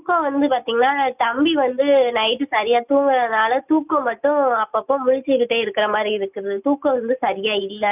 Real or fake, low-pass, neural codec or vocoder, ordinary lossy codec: real; 3.6 kHz; none; none